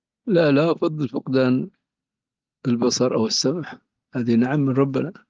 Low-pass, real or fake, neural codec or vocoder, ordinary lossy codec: 7.2 kHz; real; none; Opus, 24 kbps